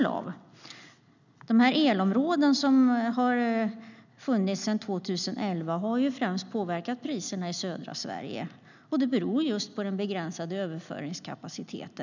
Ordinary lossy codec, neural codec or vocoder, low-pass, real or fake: none; none; 7.2 kHz; real